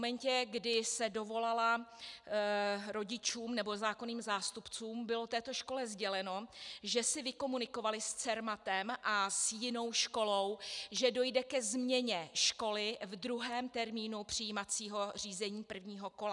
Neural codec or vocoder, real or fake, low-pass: none; real; 10.8 kHz